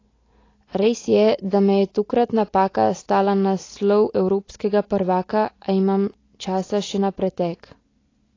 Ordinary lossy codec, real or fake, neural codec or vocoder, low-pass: AAC, 32 kbps; real; none; 7.2 kHz